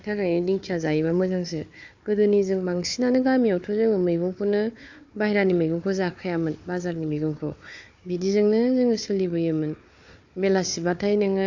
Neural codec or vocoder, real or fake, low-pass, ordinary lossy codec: codec, 16 kHz, 4 kbps, FunCodec, trained on Chinese and English, 50 frames a second; fake; 7.2 kHz; AAC, 48 kbps